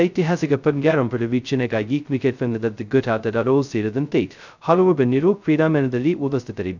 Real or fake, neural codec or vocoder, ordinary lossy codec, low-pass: fake; codec, 16 kHz, 0.2 kbps, FocalCodec; none; 7.2 kHz